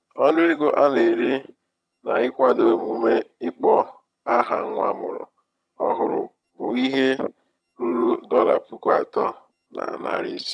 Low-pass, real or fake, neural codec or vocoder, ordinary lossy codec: none; fake; vocoder, 22.05 kHz, 80 mel bands, HiFi-GAN; none